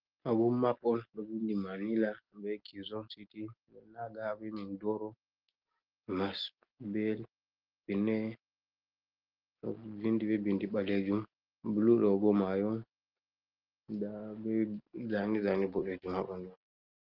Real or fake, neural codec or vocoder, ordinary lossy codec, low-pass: real; none; Opus, 16 kbps; 5.4 kHz